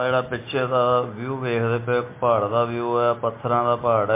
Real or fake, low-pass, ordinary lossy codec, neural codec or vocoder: real; 3.6 kHz; MP3, 24 kbps; none